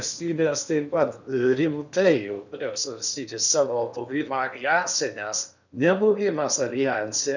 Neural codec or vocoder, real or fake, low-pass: codec, 16 kHz in and 24 kHz out, 0.8 kbps, FocalCodec, streaming, 65536 codes; fake; 7.2 kHz